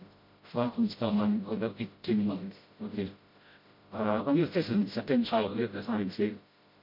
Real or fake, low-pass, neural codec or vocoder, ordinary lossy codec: fake; 5.4 kHz; codec, 16 kHz, 0.5 kbps, FreqCodec, smaller model; MP3, 32 kbps